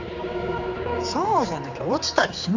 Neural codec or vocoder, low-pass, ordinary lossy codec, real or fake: codec, 16 kHz, 4 kbps, X-Codec, HuBERT features, trained on general audio; 7.2 kHz; none; fake